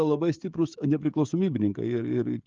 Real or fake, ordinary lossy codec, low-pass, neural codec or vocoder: fake; Opus, 24 kbps; 7.2 kHz; codec, 16 kHz, 16 kbps, FreqCodec, smaller model